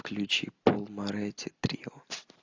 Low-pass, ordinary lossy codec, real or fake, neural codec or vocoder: 7.2 kHz; AAC, 48 kbps; real; none